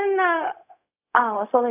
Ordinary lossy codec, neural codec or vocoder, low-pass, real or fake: none; codec, 16 kHz, 0.4 kbps, LongCat-Audio-Codec; 3.6 kHz; fake